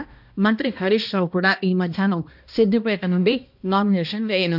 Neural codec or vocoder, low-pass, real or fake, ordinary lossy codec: codec, 16 kHz, 1 kbps, X-Codec, HuBERT features, trained on balanced general audio; 5.4 kHz; fake; none